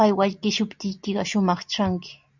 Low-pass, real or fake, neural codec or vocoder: 7.2 kHz; real; none